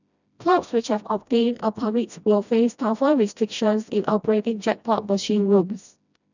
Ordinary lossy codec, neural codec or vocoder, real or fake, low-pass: none; codec, 16 kHz, 1 kbps, FreqCodec, smaller model; fake; 7.2 kHz